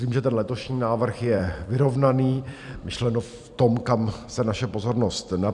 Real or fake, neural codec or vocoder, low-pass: fake; vocoder, 44.1 kHz, 128 mel bands every 256 samples, BigVGAN v2; 10.8 kHz